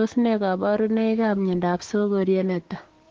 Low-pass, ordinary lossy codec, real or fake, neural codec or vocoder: 7.2 kHz; Opus, 16 kbps; fake; codec, 16 kHz, 6 kbps, DAC